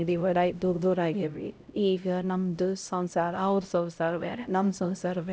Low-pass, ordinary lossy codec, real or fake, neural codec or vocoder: none; none; fake; codec, 16 kHz, 0.5 kbps, X-Codec, HuBERT features, trained on LibriSpeech